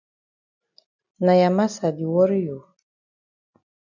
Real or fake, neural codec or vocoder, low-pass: real; none; 7.2 kHz